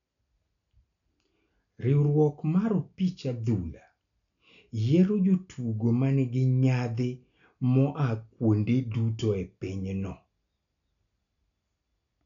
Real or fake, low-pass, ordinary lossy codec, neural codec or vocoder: real; 7.2 kHz; none; none